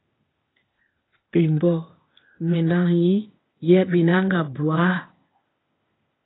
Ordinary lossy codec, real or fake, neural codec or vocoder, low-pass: AAC, 16 kbps; fake; codec, 16 kHz, 0.8 kbps, ZipCodec; 7.2 kHz